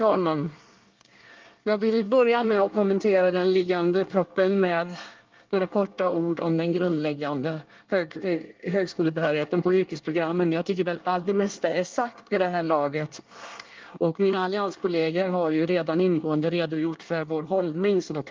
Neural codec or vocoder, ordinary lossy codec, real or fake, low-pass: codec, 24 kHz, 1 kbps, SNAC; Opus, 32 kbps; fake; 7.2 kHz